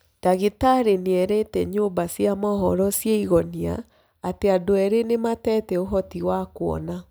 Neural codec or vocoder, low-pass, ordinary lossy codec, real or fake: none; none; none; real